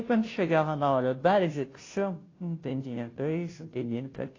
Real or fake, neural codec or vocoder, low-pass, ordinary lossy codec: fake; codec, 16 kHz, 0.5 kbps, FunCodec, trained on Chinese and English, 25 frames a second; 7.2 kHz; AAC, 32 kbps